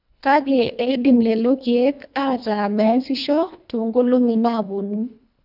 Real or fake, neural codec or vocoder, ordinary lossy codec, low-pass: fake; codec, 24 kHz, 1.5 kbps, HILCodec; none; 5.4 kHz